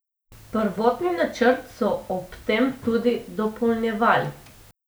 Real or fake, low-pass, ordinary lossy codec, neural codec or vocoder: real; none; none; none